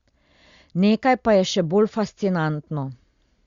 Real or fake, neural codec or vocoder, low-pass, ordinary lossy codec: real; none; 7.2 kHz; Opus, 64 kbps